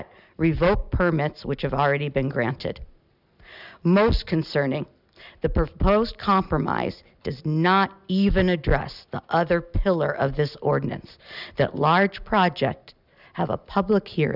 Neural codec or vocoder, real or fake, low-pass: none; real; 5.4 kHz